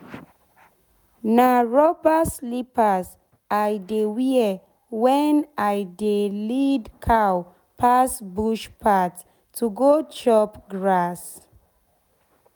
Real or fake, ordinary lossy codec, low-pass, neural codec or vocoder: real; none; none; none